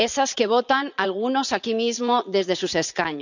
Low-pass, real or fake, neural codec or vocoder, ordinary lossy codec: 7.2 kHz; fake; vocoder, 44.1 kHz, 80 mel bands, Vocos; none